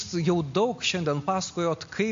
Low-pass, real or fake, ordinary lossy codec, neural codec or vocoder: 7.2 kHz; real; MP3, 48 kbps; none